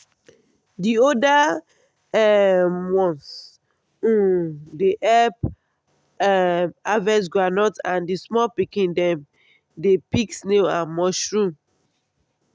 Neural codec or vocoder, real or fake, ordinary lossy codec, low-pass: none; real; none; none